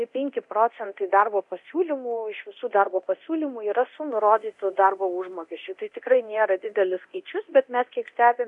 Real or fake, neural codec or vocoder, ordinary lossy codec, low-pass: fake; codec, 24 kHz, 0.9 kbps, DualCodec; MP3, 96 kbps; 10.8 kHz